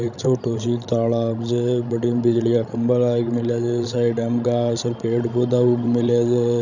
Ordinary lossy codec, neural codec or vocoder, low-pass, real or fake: none; codec, 16 kHz, 16 kbps, FreqCodec, larger model; 7.2 kHz; fake